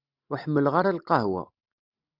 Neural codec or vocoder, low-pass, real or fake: none; 5.4 kHz; real